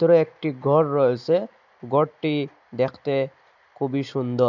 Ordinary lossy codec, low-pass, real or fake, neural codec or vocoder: none; 7.2 kHz; real; none